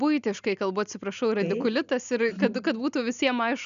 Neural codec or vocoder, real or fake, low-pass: none; real; 7.2 kHz